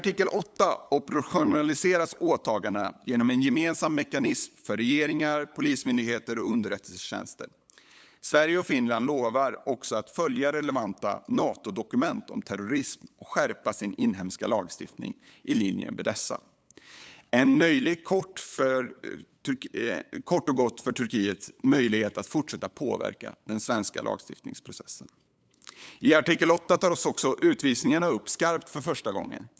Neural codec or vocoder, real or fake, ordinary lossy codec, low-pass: codec, 16 kHz, 8 kbps, FunCodec, trained on LibriTTS, 25 frames a second; fake; none; none